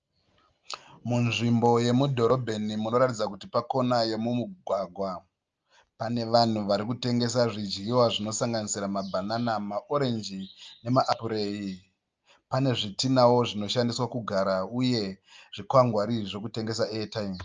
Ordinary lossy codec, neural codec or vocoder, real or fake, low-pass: Opus, 24 kbps; none; real; 7.2 kHz